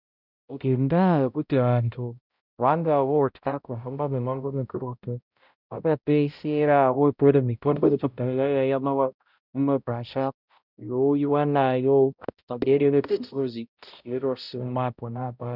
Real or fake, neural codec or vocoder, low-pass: fake; codec, 16 kHz, 0.5 kbps, X-Codec, HuBERT features, trained on balanced general audio; 5.4 kHz